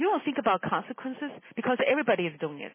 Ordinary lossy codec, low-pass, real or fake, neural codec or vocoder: MP3, 16 kbps; 3.6 kHz; real; none